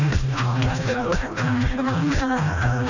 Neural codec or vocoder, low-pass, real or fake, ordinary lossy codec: codec, 16 kHz, 1 kbps, FreqCodec, smaller model; 7.2 kHz; fake; none